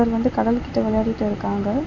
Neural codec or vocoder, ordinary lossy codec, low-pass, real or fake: none; none; 7.2 kHz; real